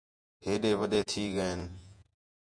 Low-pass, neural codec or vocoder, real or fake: 9.9 kHz; vocoder, 48 kHz, 128 mel bands, Vocos; fake